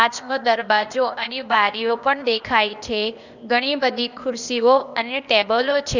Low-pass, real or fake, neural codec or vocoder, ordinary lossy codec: 7.2 kHz; fake; codec, 16 kHz, 0.8 kbps, ZipCodec; none